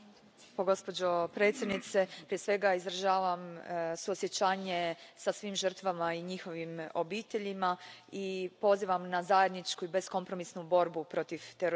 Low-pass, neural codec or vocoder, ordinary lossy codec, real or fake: none; none; none; real